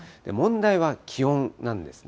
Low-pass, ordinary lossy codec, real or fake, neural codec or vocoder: none; none; real; none